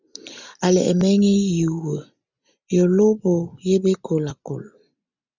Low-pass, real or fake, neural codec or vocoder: 7.2 kHz; real; none